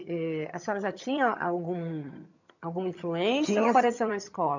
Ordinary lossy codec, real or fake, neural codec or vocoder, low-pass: none; fake; vocoder, 22.05 kHz, 80 mel bands, HiFi-GAN; 7.2 kHz